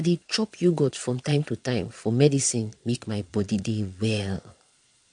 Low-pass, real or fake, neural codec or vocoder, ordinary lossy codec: 9.9 kHz; fake; vocoder, 22.05 kHz, 80 mel bands, Vocos; MP3, 64 kbps